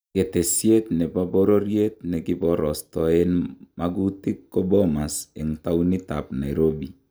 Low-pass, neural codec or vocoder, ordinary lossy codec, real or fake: none; none; none; real